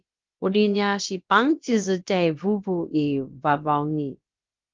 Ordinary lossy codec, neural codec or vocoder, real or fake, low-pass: Opus, 32 kbps; codec, 16 kHz, about 1 kbps, DyCAST, with the encoder's durations; fake; 7.2 kHz